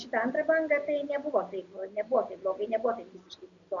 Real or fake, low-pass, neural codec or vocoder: real; 7.2 kHz; none